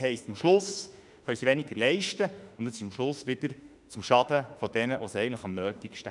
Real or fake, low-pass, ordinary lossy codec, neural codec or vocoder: fake; 10.8 kHz; none; autoencoder, 48 kHz, 32 numbers a frame, DAC-VAE, trained on Japanese speech